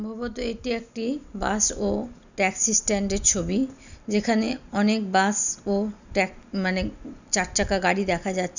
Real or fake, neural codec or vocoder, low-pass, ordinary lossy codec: real; none; 7.2 kHz; none